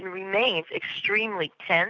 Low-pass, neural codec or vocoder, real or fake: 7.2 kHz; none; real